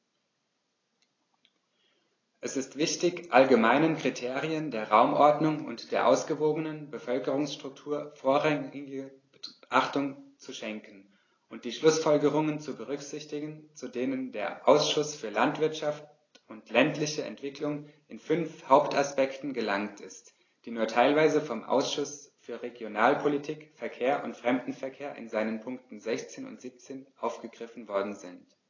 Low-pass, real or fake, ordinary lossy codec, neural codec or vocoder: 7.2 kHz; real; AAC, 32 kbps; none